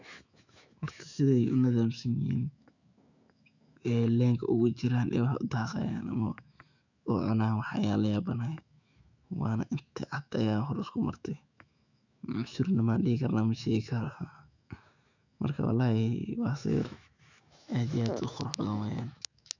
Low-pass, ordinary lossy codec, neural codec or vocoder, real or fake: 7.2 kHz; none; autoencoder, 48 kHz, 128 numbers a frame, DAC-VAE, trained on Japanese speech; fake